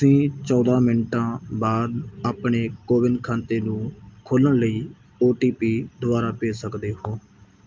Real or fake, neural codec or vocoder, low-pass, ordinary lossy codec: real; none; 7.2 kHz; Opus, 24 kbps